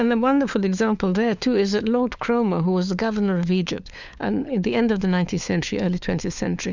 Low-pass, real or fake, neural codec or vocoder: 7.2 kHz; fake; codec, 16 kHz, 4 kbps, FunCodec, trained on LibriTTS, 50 frames a second